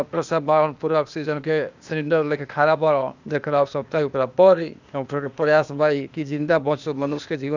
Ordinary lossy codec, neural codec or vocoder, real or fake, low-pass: none; codec, 16 kHz, 0.8 kbps, ZipCodec; fake; 7.2 kHz